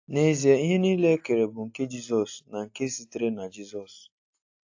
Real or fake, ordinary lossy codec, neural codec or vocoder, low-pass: real; AAC, 48 kbps; none; 7.2 kHz